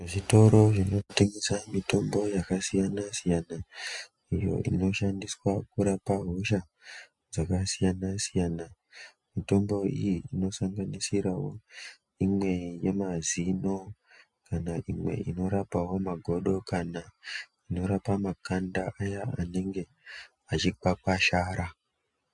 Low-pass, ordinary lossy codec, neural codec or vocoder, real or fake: 10.8 kHz; MP3, 64 kbps; none; real